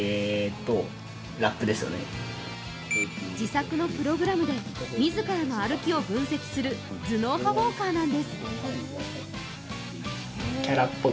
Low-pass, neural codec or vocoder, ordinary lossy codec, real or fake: none; none; none; real